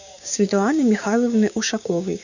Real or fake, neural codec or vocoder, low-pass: fake; codec, 16 kHz, 6 kbps, DAC; 7.2 kHz